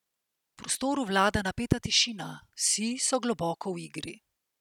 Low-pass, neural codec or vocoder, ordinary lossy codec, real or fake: 19.8 kHz; none; none; real